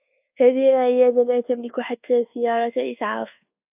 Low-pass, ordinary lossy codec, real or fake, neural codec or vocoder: 3.6 kHz; AAC, 32 kbps; fake; codec, 24 kHz, 1.2 kbps, DualCodec